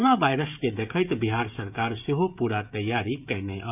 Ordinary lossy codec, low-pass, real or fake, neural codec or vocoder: none; 3.6 kHz; fake; codec, 16 kHz, 16 kbps, FreqCodec, smaller model